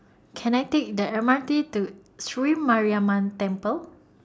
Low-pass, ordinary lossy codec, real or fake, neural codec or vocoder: none; none; real; none